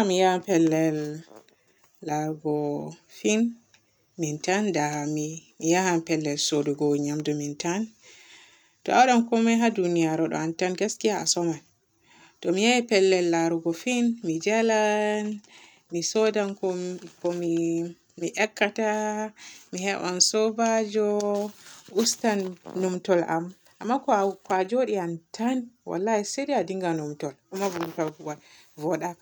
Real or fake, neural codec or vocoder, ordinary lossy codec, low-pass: real; none; none; none